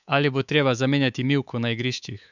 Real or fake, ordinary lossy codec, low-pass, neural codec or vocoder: fake; none; 7.2 kHz; codec, 24 kHz, 3.1 kbps, DualCodec